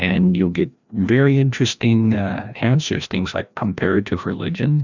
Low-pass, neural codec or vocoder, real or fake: 7.2 kHz; codec, 16 kHz, 1 kbps, FreqCodec, larger model; fake